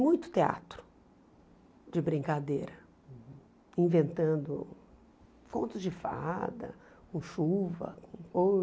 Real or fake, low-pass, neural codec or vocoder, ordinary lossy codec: real; none; none; none